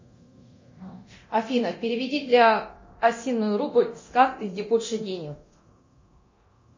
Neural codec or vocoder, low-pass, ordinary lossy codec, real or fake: codec, 24 kHz, 0.9 kbps, DualCodec; 7.2 kHz; MP3, 32 kbps; fake